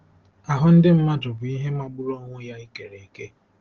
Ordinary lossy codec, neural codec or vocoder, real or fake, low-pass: Opus, 16 kbps; none; real; 7.2 kHz